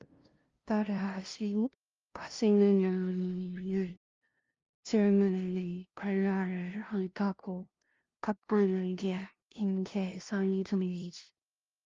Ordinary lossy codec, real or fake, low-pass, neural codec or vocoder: Opus, 32 kbps; fake; 7.2 kHz; codec, 16 kHz, 0.5 kbps, FunCodec, trained on LibriTTS, 25 frames a second